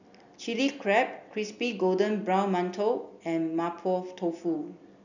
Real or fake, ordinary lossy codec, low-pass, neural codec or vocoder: real; none; 7.2 kHz; none